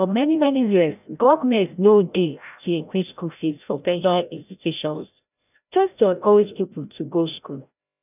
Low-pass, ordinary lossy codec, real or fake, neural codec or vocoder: 3.6 kHz; none; fake; codec, 16 kHz, 0.5 kbps, FreqCodec, larger model